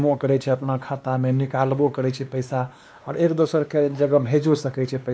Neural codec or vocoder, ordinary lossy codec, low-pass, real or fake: codec, 16 kHz, 2 kbps, X-Codec, WavLM features, trained on Multilingual LibriSpeech; none; none; fake